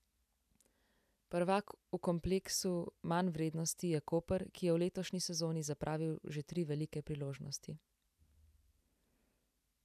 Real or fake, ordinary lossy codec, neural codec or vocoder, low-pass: real; none; none; 14.4 kHz